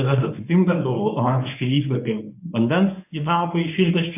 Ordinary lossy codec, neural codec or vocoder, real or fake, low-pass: AAC, 32 kbps; codec, 24 kHz, 0.9 kbps, WavTokenizer, medium speech release version 1; fake; 3.6 kHz